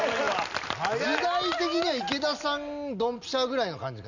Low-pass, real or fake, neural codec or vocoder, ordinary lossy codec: 7.2 kHz; real; none; none